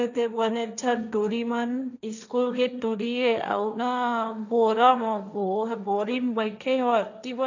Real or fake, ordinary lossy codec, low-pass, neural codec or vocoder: fake; none; 7.2 kHz; codec, 16 kHz, 1.1 kbps, Voila-Tokenizer